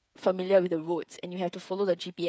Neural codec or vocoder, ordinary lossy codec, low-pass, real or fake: codec, 16 kHz, 8 kbps, FreqCodec, smaller model; none; none; fake